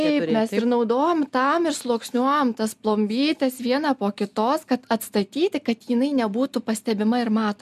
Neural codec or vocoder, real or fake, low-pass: none; real; 14.4 kHz